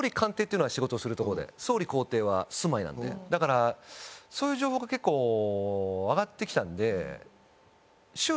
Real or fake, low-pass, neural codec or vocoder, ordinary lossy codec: real; none; none; none